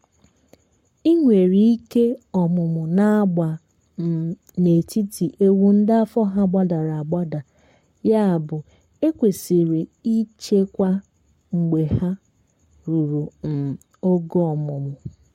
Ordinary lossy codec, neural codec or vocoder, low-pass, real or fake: MP3, 64 kbps; codec, 44.1 kHz, 7.8 kbps, Pupu-Codec; 19.8 kHz; fake